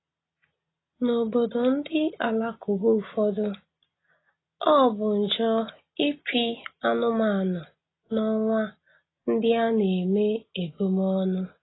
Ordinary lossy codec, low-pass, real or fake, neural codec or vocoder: AAC, 16 kbps; 7.2 kHz; real; none